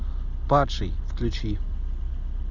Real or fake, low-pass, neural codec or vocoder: real; 7.2 kHz; none